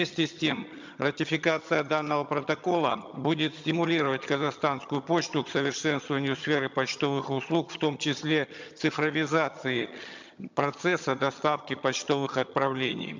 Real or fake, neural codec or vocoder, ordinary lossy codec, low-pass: fake; vocoder, 22.05 kHz, 80 mel bands, HiFi-GAN; MP3, 64 kbps; 7.2 kHz